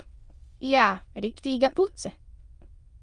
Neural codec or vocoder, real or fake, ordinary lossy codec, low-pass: autoencoder, 22.05 kHz, a latent of 192 numbers a frame, VITS, trained on many speakers; fake; Opus, 32 kbps; 9.9 kHz